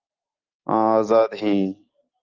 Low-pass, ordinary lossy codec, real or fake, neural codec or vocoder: 7.2 kHz; Opus, 32 kbps; real; none